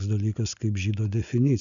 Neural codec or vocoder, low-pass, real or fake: none; 7.2 kHz; real